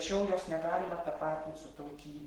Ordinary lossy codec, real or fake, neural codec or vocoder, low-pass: Opus, 16 kbps; fake; codec, 44.1 kHz, 7.8 kbps, Pupu-Codec; 19.8 kHz